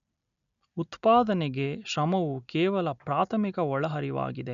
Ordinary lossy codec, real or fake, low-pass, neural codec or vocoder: none; real; 7.2 kHz; none